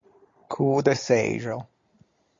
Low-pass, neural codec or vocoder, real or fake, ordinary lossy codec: 7.2 kHz; none; real; MP3, 48 kbps